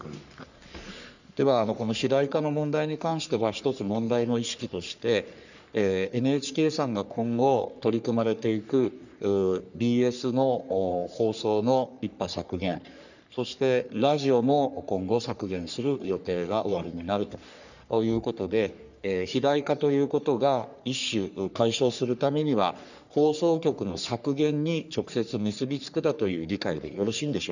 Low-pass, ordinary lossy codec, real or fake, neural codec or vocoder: 7.2 kHz; none; fake; codec, 44.1 kHz, 3.4 kbps, Pupu-Codec